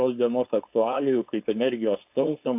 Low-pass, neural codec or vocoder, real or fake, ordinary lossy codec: 3.6 kHz; codec, 16 kHz, 4.8 kbps, FACodec; fake; AAC, 32 kbps